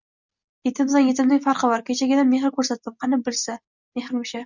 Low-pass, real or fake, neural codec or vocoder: 7.2 kHz; real; none